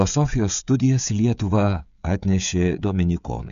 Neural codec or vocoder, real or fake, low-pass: codec, 16 kHz, 16 kbps, FreqCodec, smaller model; fake; 7.2 kHz